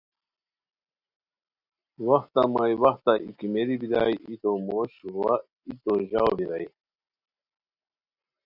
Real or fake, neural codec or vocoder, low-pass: real; none; 5.4 kHz